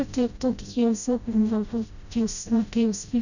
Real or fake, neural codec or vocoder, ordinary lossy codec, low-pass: fake; codec, 16 kHz, 0.5 kbps, FreqCodec, smaller model; none; 7.2 kHz